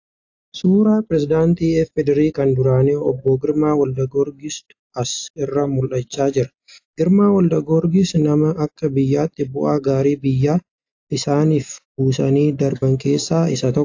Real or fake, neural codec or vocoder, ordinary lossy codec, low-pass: real; none; AAC, 48 kbps; 7.2 kHz